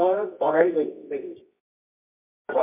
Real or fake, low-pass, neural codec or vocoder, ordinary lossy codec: fake; 3.6 kHz; codec, 24 kHz, 0.9 kbps, WavTokenizer, medium music audio release; MP3, 32 kbps